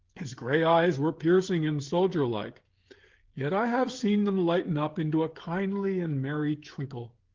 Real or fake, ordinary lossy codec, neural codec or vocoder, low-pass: fake; Opus, 16 kbps; codec, 16 kHz, 16 kbps, FreqCodec, smaller model; 7.2 kHz